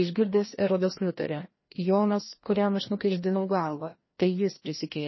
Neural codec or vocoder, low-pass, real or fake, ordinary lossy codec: codec, 16 kHz, 1 kbps, FreqCodec, larger model; 7.2 kHz; fake; MP3, 24 kbps